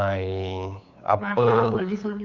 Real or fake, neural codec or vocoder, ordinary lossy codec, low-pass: fake; codec, 16 kHz, 8 kbps, FunCodec, trained on LibriTTS, 25 frames a second; none; 7.2 kHz